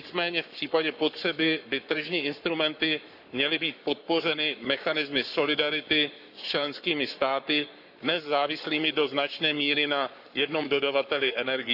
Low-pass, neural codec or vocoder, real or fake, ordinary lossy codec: 5.4 kHz; codec, 44.1 kHz, 7.8 kbps, Pupu-Codec; fake; none